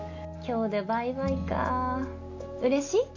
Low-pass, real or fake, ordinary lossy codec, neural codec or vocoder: 7.2 kHz; real; none; none